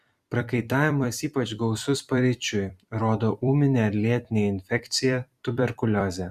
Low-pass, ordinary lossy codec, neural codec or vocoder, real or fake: 14.4 kHz; Opus, 64 kbps; vocoder, 44.1 kHz, 128 mel bands every 256 samples, BigVGAN v2; fake